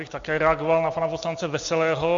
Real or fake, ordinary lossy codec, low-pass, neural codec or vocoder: real; MP3, 48 kbps; 7.2 kHz; none